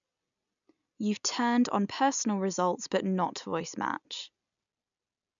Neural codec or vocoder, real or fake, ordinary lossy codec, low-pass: none; real; none; 7.2 kHz